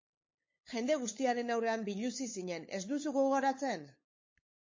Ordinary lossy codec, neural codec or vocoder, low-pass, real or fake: MP3, 32 kbps; codec, 16 kHz, 8 kbps, FunCodec, trained on LibriTTS, 25 frames a second; 7.2 kHz; fake